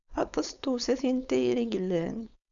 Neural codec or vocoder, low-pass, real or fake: codec, 16 kHz, 4.8 kbps, FACodec; 7.2 kHz; fake